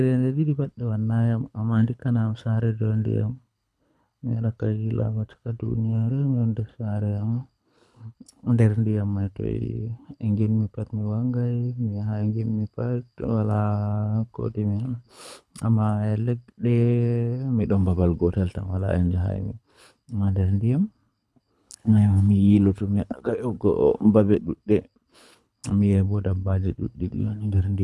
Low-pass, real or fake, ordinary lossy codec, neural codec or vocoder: none; fake; none; codec, 24 kHz, 6 kbps, HILCodec